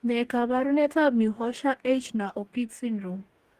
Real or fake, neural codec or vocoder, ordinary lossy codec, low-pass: fake; codec, 44.1 kHz, 2.6 kbps, DAC; Opus, 24 kbps; 14.4 kHz